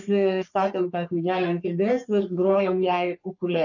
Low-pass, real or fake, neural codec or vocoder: 7.2 kHz; fake; codec, 44.1 kHz, 3.4 kbps, Pupu-Codec